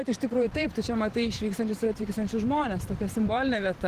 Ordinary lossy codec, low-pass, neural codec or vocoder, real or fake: Opus, 16 kbps; 14.4 kHz; vocoder, 44.1 kHz, 128 mel bands every 512 samples, BigVGAN v2; fake